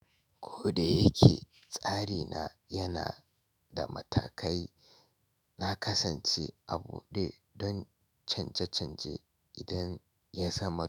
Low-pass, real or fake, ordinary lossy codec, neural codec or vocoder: none; fake; none; autoencoder, 48 kHz, 128 numbers a frame, DAC-VAE, trained on Japanese speech